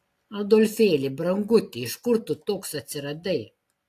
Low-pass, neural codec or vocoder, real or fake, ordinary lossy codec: 14.4 kHz; none; real; AAC, 64 kbps